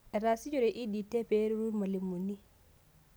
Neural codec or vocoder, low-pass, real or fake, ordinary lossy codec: none; none; real; none